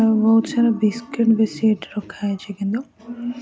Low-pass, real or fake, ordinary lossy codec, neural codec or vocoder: none; real; none; none